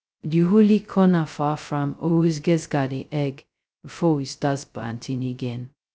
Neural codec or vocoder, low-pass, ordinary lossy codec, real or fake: codec, 16 kHz, 0.2 kbps, FocalCodec; none; none; fake